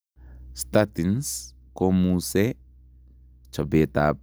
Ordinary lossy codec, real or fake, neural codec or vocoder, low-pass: none; real; none; none